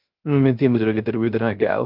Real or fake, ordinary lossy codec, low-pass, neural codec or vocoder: fake; Opus, 24 kbps; 5.4 kHz; codec, 16 kHz, 0.3 kbps, FocalCodec